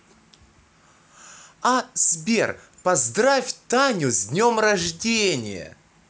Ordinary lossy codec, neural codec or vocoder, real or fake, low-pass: none; none; real; none